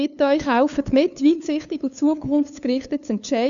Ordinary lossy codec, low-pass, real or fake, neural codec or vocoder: none; 7.2 kHz; fake; codec, 16 kHz, 2 kbps, FunCodec, trained on LibriTTS, 25 frames a second